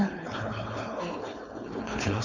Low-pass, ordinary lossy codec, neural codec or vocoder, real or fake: 7.2 kHz; none; codec, 16 kHz, 4.8 kbps, FACodec; fake